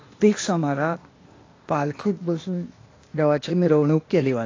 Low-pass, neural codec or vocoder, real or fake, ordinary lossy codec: 7.2 kHz; codec, 16 kHz, 0.8 kbps, ZipCodec; fake; AAC, 32 kbps